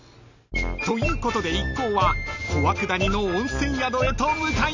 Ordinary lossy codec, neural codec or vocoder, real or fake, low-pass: Opus, 64 kbps; none; real; 7.2 kHz